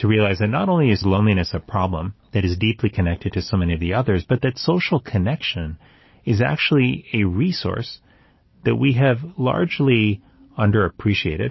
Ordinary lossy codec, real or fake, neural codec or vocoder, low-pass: MP3, 24 kbps; real; none; 7.2 kHz